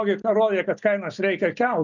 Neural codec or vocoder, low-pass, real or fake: none; 7.2 kHz; real